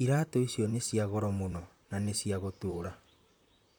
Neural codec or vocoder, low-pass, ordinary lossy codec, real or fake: vocoder, 44.1 kHz, 128 mel bands, Pupu-Vocoder; none; none; fake